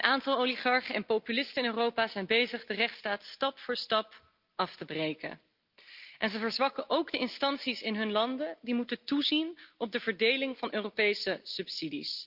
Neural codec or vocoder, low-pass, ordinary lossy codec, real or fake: none; 5.4 kHz; Opus, 24 kbps; real